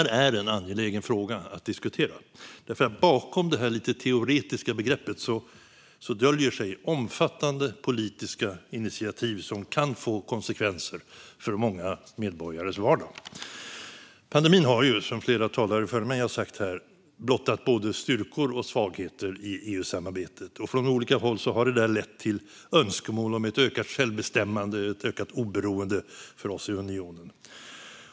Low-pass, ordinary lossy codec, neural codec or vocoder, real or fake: none; none; none; real